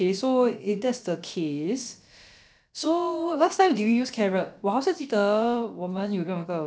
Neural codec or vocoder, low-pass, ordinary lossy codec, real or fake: codec, 16 kHz, about 1 kbps, DyCAST, with the encoder's durations; none; none; fake